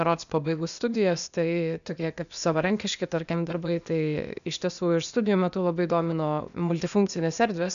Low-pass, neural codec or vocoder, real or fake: 7.2 kHz; codec, 16 kHz, 0.8 kbps, ZipCodec; fake